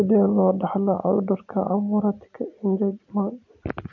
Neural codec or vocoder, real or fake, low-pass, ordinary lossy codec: none; real; 7.2 kHz; MP3, 48 kbps